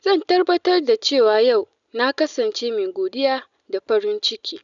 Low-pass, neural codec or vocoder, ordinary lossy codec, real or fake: 7.2 kHz; none; none; real